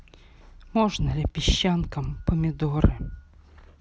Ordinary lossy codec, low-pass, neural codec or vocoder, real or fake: none; none; none; real